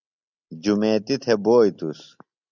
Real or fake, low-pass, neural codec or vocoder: real; 7.2 kHz; none